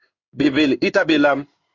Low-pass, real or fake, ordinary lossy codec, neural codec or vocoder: 7.2 kHz; fake; Opus, 64 kbps; codec, 16 kHz in and 24 kHz out, 1 kbps, XY-Tokenizer